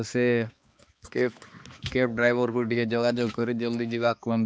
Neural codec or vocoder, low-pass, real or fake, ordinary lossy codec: codec, 16 kHz, 2 kbps, X-Codec, HuBERT features, trained on balanced general audio; none; fake; none